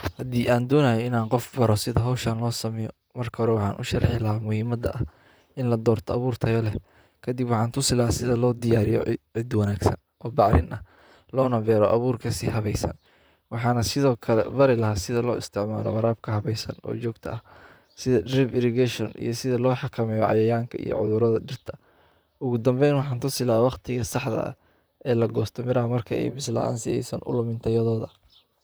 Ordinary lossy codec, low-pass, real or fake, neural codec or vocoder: none; none; fake; vocoder, 44.1 kHz, 128 mel bands, Pupu-Vocoder